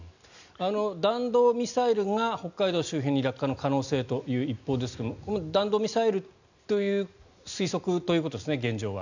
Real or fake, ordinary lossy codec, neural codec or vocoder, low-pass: real; none; none; 7.2 kHz